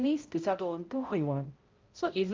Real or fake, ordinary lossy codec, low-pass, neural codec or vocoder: fake; Opus, 24 kbps; 7.2 kHz; codec, 16 kHz, 0.5 kbps, X-Codec, HuBERT features, trained on balanced general audio